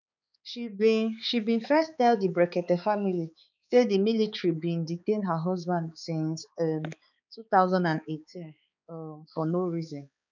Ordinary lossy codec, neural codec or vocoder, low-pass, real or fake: none; codec, 16 kHz, 4 kbps, X-Codec, HuBERT features, trained on balanced general audio; 7.2 kHz; fake